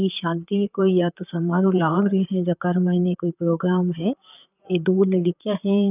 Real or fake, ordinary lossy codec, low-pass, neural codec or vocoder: fake; none; 3.6 kHz; vocoder, 44.1 kHz, 128 mel bands, Pupu-Vocoder